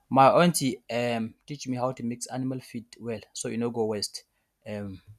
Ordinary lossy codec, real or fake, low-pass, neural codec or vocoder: none; real; 14.4 kHz; none